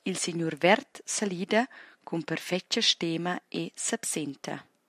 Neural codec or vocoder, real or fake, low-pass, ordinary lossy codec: none; real; 14.4 kHz; AAC, 96 kbps